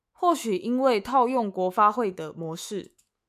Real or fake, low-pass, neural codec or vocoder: fake; 14.4 kHz; autoencoder, 48 kHz, 128 numbers a frame, DAC-VAE, trained on Japanese speech